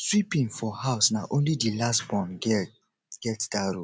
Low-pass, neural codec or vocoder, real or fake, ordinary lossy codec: none; none; real; none